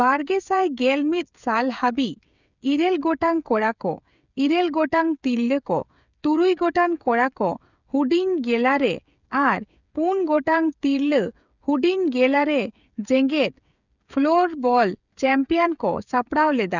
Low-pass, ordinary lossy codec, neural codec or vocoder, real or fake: 7.2 kHz; none; codec, 16 kHz, 8 kbps, FreqCodec, smaller model; fake